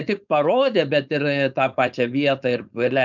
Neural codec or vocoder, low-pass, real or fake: codec, 16 kHz, 4.8 kbps, FACodec; 7.2 kHz; fake